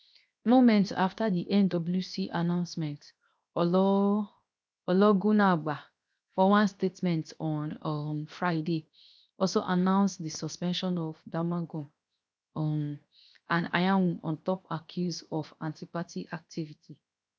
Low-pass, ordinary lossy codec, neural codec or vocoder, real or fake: none; none; codec, 16 kHz, 0.7 kbps, FocalCodec; fake